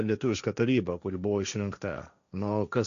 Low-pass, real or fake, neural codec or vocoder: 7.2 kHz; fake; codec, 16 kHz, 1.1 kbps, Voila-Tokenizer